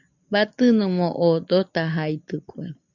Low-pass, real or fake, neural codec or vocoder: 7.2 kHz; real; none